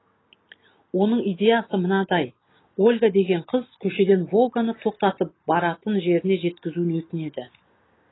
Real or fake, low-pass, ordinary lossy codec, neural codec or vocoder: real; 7.2 kHz; AAC, 16 kbps; none